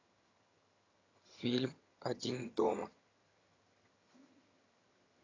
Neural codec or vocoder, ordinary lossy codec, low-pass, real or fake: vocoder, 22.05 kHz, 80 mel bands, HiFi-GAN; AAC, 32 kbps; 7.2 kHz; fake